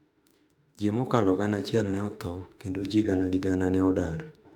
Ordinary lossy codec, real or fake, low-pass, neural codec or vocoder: none; fake; 19.8 kHz; autoencoder, 48 kHz, 32 numbers a frame, DAC-VAE, trained on Japanese speech